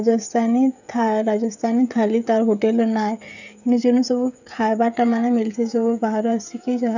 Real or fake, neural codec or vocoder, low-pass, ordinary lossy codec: fake; codec, 16 kHz, 16 kbps, FreqCodec, smaller model; 7.2 kHz; none